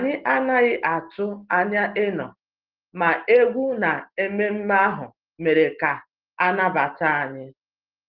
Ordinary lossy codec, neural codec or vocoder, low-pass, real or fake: Opus, 16 kbps; none; 5.4 kHz; real